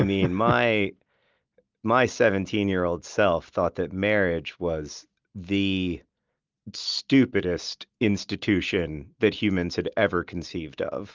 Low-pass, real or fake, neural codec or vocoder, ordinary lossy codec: 7.2 kHz; real; none; Opus, 24 kbps